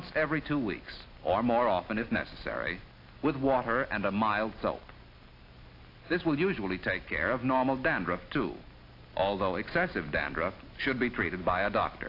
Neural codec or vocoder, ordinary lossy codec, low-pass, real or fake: none; AAC, 32 kbps; 5.4 kHz; real